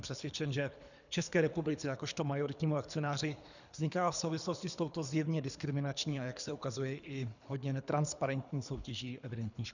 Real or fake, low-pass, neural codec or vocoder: fake; 7.2 kHz; codec, 24 kHz, 3 kbps, HILCodec